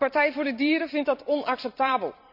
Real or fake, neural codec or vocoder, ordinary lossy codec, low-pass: fake; vocoder, 44.1 kHz, 128 mel bands every 256 samples, BigVGAN v2; none; 5.4 kHz